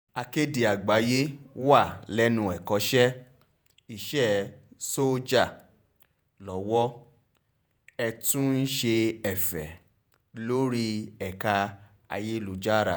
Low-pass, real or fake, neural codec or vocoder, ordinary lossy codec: none; fake; vocoder, 48 kHz, 128 mel bands, Vocos; none